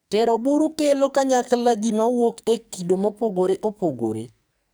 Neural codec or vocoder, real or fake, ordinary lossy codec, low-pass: codec, 44.1 kHz, 2.6 kbps, SNAC; fake; none; none